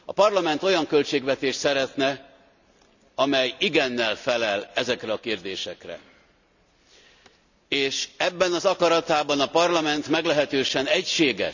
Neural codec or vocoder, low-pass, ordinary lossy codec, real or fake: none; 7.2 kHz; none; real